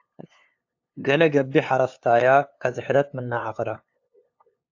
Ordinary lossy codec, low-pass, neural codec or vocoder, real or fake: AAC, 48 kbps; 7.2 kHz; codec, 16 kHz, 2 kbps, FunCodec, trained on LibriTTS, 25 frames a second; fake